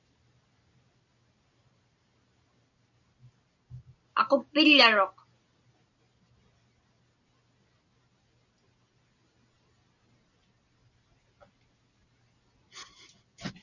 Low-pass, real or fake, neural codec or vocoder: 7.2 kHz; real; none